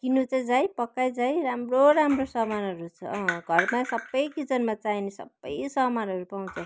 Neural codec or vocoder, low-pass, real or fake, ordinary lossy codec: none; none; real; none